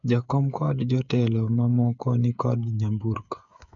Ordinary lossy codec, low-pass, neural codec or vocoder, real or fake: none; 7.2 kHz; codec, 16 kHz, 8 kbps, FreqCodec, smaller model; fake